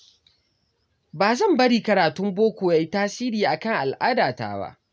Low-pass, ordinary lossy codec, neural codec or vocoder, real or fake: none; none; none; real